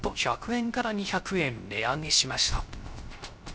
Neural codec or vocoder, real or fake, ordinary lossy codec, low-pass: codec, 16 kHz, 0.3 kbps, FocalCodec; fake; none; none